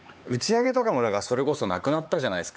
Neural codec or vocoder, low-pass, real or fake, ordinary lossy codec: codec, 16 kHz, 4 kbps, X-Codec, HuBERT features, trained on LibriSpeech; none; fake; none